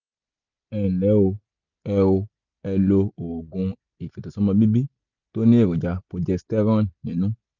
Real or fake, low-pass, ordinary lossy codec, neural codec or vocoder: real; 7.2 kHz; none; none